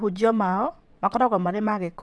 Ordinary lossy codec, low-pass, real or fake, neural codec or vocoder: none; none; fake; vocoder, 22.05 kHz, 80 mel bands, WaveNeXt